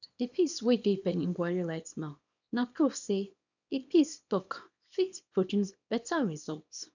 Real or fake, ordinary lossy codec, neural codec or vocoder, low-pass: fake; none; codec, 24 kHz, 0.9 kbps, WavTokenizer, small release; 7.2 kHz